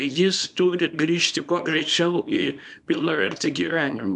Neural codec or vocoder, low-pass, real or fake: codec, 24 kHz, 0.9 kbps, WavTokenizer, small release; 10.8 kHz; fake